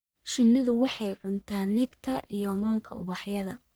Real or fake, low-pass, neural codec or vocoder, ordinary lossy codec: fake; none; codec, 44.1 kHz, 1.7 kbps, Pupu-Codec; none